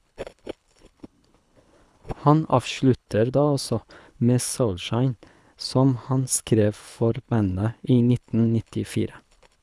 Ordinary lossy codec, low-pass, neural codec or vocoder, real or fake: none; none; codec, 24 kHz, 6 kbps, HILCodec; fake